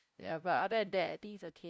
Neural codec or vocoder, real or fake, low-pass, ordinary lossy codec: codec, 16 kHz, 0.5 kbps, FunCodec, trained on LibriTTS, 25 frames a second; fake; none; none